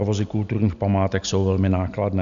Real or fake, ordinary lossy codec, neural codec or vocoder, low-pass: real; MP3, 96 kbps; none; 7.2 kHz